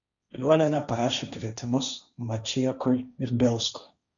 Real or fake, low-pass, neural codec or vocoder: fake; 7.2 kHz; codec, 16 kHz, 1.1 kbps, Voila-Tokenizer